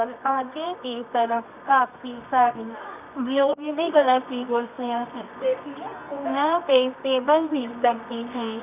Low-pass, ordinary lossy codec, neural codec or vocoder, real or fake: 3.6 kHz; none; codec, 24 kHz, 0.9 kbps, WavTokenizer, medium music audio release; fake